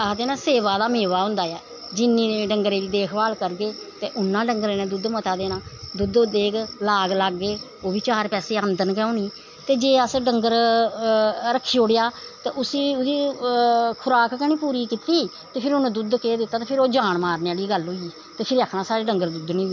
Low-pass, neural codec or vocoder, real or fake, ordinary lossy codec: 7.2 kHz; none; real; MP3, 48 kbps